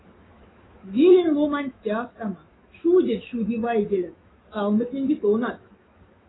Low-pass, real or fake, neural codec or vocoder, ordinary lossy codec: 7.2 kHz; fake; autoencoder, 48 kHz, 128 numbers a frame, DAC-VAE, trained on Japanese speech; AAC, 16 kbps